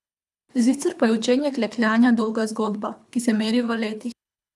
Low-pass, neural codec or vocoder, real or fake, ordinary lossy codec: none; codec, 24 kHz, 3 kbps, HILCodec; fake; none